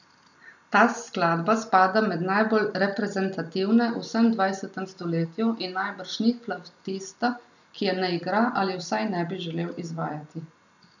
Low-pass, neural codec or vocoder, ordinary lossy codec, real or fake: 7.2 kHz; none; none; real